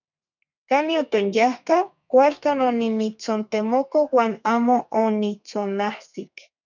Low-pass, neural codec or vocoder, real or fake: 7.2 kHz; codec, 32 kHz, 1.9 kbps, SNAC; fake